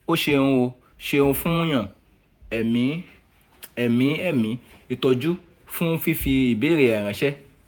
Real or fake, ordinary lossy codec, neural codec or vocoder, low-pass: fake; none; vocoder, 48 kHz, 128 mel bands, Vocos; none